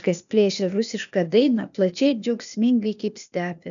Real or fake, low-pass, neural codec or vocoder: fake; 7.2 kHz; codec, 16 kHz, 0.8 kbps, ZipCodec